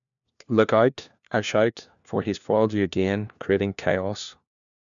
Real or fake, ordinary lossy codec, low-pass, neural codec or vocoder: fake; none; 7.2 kHz; codec, 16 kHz, 1 kbps, FunCodec, trained on LibriTTS, 50 frames a second